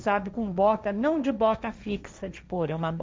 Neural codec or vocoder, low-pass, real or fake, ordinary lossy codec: codec, 16 kHz, 1.1 kbps, Voila-Tokenizer; none; fake; none